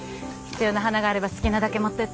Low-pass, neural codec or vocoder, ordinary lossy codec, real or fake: none; none; none; real